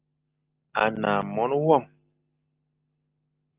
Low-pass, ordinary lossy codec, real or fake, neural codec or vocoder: 3.6 kHz; Opus, 24 kbps; real; none